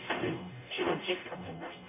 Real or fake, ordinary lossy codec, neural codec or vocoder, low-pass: fake; none; codec, 44.1 kHz, 0.9 kbps, DAC; 3.6 kHz